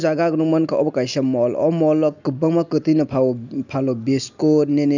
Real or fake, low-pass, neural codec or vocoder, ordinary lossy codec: real; 7.2 kHz; none; none